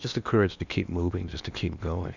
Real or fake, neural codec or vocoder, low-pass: fake; codec, 16 kHz in and 24 kHz out, 0.8 kbps, FocalCodec, streaming, 65536 codes; 7.2 kHz